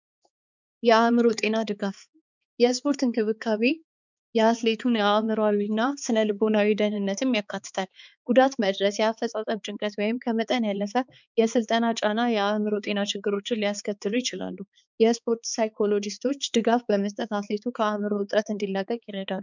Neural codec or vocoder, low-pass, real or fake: codec, 16 kHz, 4 kbps, X-Codec, HuBERT features, trained on balanced general audio; 7.2 kHz; fake